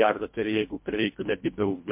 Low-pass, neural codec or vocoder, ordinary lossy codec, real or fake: 3.6 kHz; codec, 24 kHz, 1.5 kbps, HILCodec; MP3, 32 kbps; fake